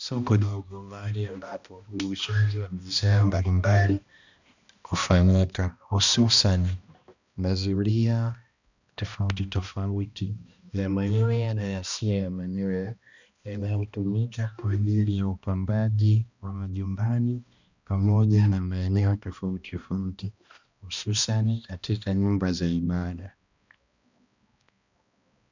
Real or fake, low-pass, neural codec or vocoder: fake; 7.2 kHz; codec, 16 kHz, 1 kbps, X-Codec, HuBERT features, trained on balanced general audio